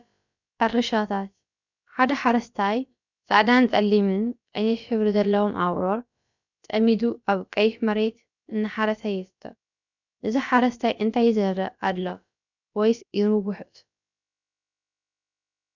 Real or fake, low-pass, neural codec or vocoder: fake; 7.2 kHz; codec, 16 kHz, about 1 kbps, DyCAST, with the encoder's durations